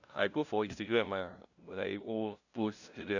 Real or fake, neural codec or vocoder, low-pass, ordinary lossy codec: fake; codec, 16 kHz, 0.5 kbps, FunCodec, trained on LibriTTS, 25 frames a second; 7.2 kHz; none